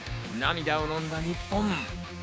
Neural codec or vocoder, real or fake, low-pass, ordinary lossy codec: codec, 16 kHz, 6 kbps, DAC; fake; none; none